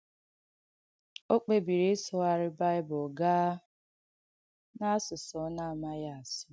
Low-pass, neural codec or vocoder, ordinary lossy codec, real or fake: none; none; none; real